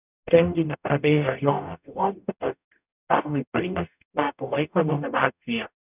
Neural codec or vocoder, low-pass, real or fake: codec, 44.1 kHz, 0.9 kbps, DAC; 3.6 kHz; fake